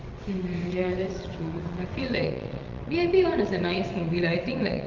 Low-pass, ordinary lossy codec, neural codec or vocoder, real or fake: 7.2 kHz; Opus, 32 kbps; vocoder, 22.05 kHz, 80 mel bands, WaveNeXt; fake